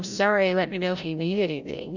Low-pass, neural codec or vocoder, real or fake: 7.2 kHz; codec, 16 kHz, 0.5 kbps, FreqCodec, larger model; fake